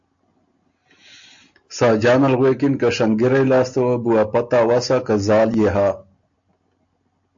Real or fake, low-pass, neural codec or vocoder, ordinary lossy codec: real; 7.2 kHz; none; AAC, 64 kbps